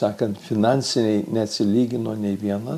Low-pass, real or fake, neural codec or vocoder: 14.4 kHz; real; none